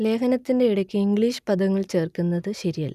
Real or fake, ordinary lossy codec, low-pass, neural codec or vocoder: real; none; 14.4 kHz; none